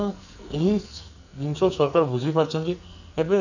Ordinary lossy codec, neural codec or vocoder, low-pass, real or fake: none; codec, 44.1 kHz, 2.6 kbps, SNAC; 7.2 kHz; fake